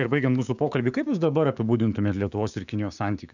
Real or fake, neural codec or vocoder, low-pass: fake; codec, 16 kHz, 6 kbps, DAC; 7.2 kHz